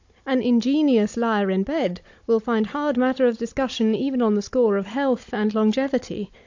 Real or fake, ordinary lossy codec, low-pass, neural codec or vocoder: fake; AAC, 48 kbps; 7.2 kHz; codec, 16 kHz, 16 kbps, FunCodec, trained on Chinese and English, 50 frames a second